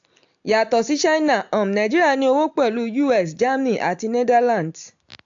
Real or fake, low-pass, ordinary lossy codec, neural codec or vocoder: real; 7.2 kHz; AAC, 64 kbps; none